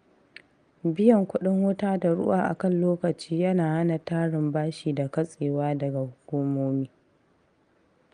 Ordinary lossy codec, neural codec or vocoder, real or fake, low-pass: Opus, 32 kbps; none; real; 9.9 kHz